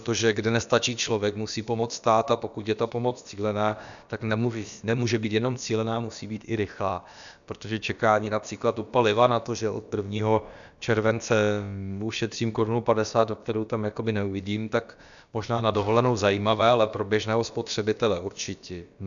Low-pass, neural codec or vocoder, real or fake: 7.2 kHz; codec, 16 kHz, about 1 kbps, DyCAST, with the encoder's durations; fake